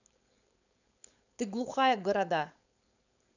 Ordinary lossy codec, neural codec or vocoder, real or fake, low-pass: none; codec, 16 kHz, 4.8 kbps, FACodec; fake; 7.2 kHz